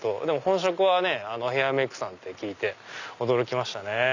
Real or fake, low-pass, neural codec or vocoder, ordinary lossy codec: real; 7.2 kHz; none; none